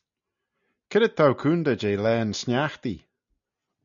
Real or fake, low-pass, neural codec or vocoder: real; 7.2 kHz; none